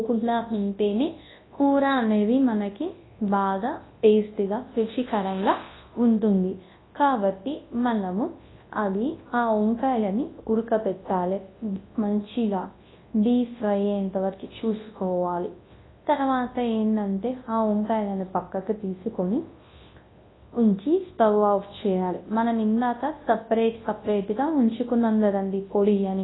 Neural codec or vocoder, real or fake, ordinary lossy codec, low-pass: codec, 24 kHz, 0.9 kbps, WavTokenizer, large speech release; fake; AAC, 16 kbps; 7.2 kHz